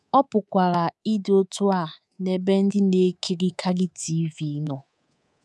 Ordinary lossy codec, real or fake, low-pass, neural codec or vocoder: none; fake; none; codec, 24 kHz, 3.1 kbps, DualCodec